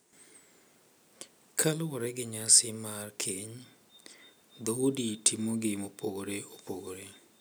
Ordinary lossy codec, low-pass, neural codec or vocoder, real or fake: none; none; none; real